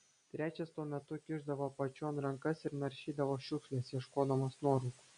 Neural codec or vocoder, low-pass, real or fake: none; 9.9 kHz; real